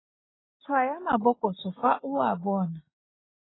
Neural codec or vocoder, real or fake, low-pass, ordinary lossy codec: none; real; 7.2 kHz; AAC, 16 kbps